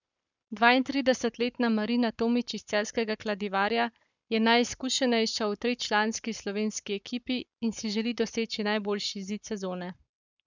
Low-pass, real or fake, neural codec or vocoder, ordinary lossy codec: 7.2 kHz; fake; codec, 44.1 kHz, 7.8 kbps, Pupu-Codec; none